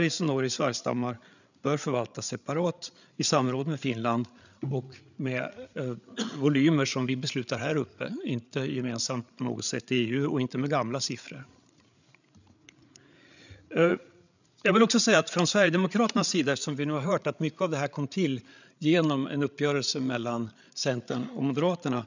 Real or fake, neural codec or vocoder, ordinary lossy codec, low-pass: fake; codec, 16 kHz, 8 kbps, FreqCodec, larger model; none; 7.2 kHz